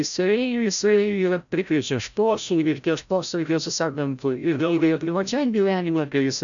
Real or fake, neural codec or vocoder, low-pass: fake; codec, 16 kHz, 0.5 kbps, FreqCodec, larger model; 7.2 kHz